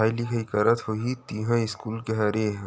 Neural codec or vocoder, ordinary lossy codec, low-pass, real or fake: none; none; none; real